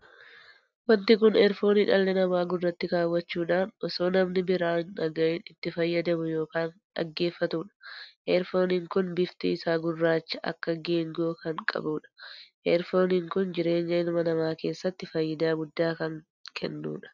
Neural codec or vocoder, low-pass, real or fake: none; 7.2 kHz; real